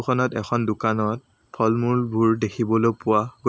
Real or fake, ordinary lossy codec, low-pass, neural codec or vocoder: real; none; none; none